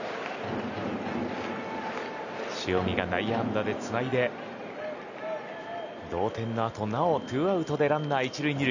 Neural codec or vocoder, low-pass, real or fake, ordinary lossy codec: none; 7.2 kHz; real; none